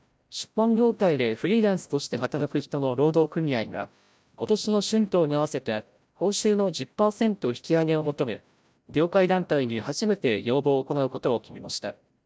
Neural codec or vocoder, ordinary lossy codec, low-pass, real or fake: codec, 16 kHz, 0.5 kbps, FreqCodec, larger model; none; none; fake